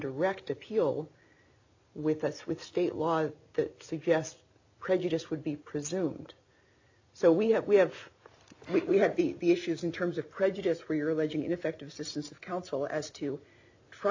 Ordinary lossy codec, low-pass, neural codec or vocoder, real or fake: AAC, 48 kbps; 7.2 kHz; none; real